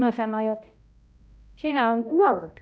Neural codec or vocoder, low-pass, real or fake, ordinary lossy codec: codec, 16 kHz, 0.5 kbps, X-Codec, HuBERT features, trained on balanced general audio; none; fake; none